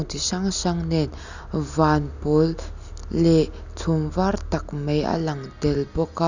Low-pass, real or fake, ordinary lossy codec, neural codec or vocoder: 7.2 kHz; real; none; none